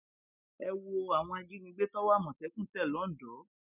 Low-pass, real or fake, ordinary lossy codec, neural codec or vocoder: 3.6 kHz; real; none; none